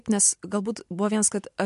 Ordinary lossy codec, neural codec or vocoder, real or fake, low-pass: MP3, 64 kbps; none; real; 10.8 kHz